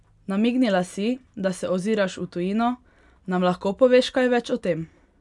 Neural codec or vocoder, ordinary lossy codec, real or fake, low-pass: none; none; real; 10.8 kHz